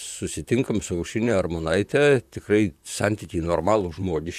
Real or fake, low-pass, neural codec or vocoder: fake; 14.4 kHz; vocoder, 44.1 kHz, 128 mel bands, Pupu-Vocoder